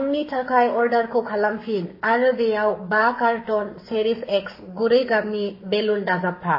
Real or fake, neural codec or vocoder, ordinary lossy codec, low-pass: fake; codec, 44.1 kHz, 7.8 kbps, Pupu-Codec; MP3, 24 kbps; 5.4 kHz